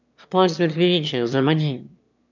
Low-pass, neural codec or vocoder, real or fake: 7.2 kHz; autoencoder, 22.05 kHz, a latent of 192 numbers a frame, VITS, trained on one speaker; fake